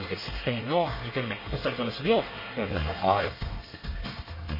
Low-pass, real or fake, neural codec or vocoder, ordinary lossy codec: 5.4 kHz; fake; codec, 24 kHz, 1 kbps, SNAC; MP3, 24 kbps